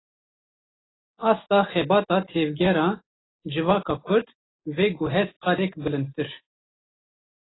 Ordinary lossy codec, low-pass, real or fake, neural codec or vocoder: AAC, 16 kbps; 7.2 kHz; real; none